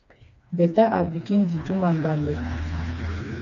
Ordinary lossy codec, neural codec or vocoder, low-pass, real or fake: AAC, 48 kbps; codec, 16 kHz, 2 kbps, FreqCodec, smaller model; 7.2 kHz; fake